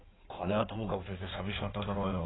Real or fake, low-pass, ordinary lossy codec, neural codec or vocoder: fake; 7.2 kHz; AAC, 16 kbps; codec, 16 kHz in and 24 kHz out, 1.1 kbps, FireRedTTS-2 codec